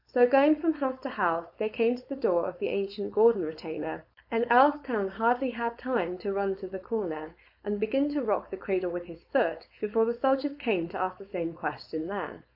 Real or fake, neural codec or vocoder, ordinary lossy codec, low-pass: fake; codec, 16 kHz, 4.8 kbps, FACodec; AAC, 48 kbps; 5.4 kHz